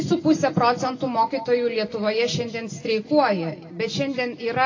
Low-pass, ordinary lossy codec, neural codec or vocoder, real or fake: 7.2 kHz; AAC, 32 kbps; none; real